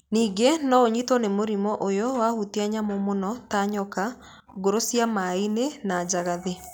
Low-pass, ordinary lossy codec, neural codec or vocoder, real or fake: none; none; none; real